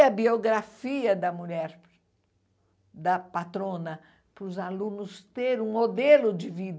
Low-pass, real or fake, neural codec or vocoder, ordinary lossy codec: none; real; none; none